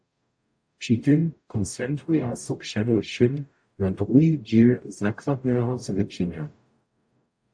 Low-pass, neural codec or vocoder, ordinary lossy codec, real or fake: 9.9 kHz; codec, 44.1 kHz, 0.9 kbps, DAC; AAC, 64 kbps; fake